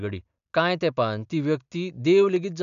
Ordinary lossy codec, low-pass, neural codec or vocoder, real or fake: none; 7.2 kHz; none; real